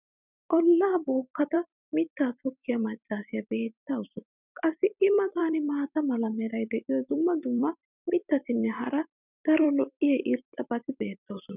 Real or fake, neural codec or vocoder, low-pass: fake; vocoder, 44.1 kHz, 128 mel bands every 256 samples, BigVGAN v2; 3.6 kHz